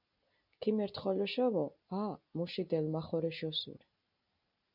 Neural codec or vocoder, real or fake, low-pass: none; real; 5.4 kHz